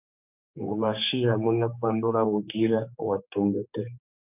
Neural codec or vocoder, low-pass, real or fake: codec, 16 kHz, 4 kbps, X-Codec, HuBERT features, trained on general audio; 3.6 kHz; fake